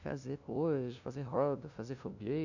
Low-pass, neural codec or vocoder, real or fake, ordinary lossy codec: 7.2 kHz; codec, 16 kHz, 0.5 kbps, FunCodec, trained on LibriTTS, 25 frames a second; fake; none